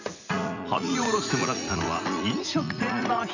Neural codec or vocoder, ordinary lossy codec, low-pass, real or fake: vocoder, 44.1 kHz, 80 mel bands, Vocos; none; 7.2 kHz; fake